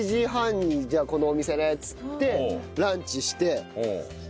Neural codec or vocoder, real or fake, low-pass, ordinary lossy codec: none; real; none; none